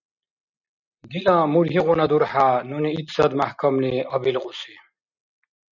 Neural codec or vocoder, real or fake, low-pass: none; real; 7.2 kHz